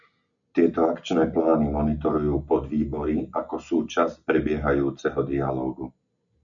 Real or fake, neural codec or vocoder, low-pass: real; none; 7.2 kHz